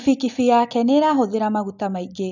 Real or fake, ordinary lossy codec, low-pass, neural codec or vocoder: real; none; 7.2 kHz; none